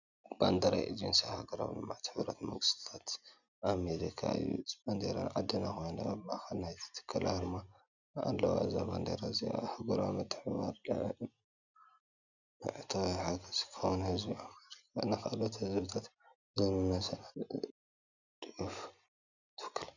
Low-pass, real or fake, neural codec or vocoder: 7.2 kHz; real; none